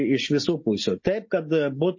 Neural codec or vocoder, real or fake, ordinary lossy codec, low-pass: none; real; MP3, 32 kbps; 7.2 kHz